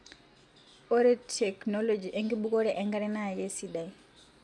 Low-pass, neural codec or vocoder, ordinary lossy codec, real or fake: none; none; none; real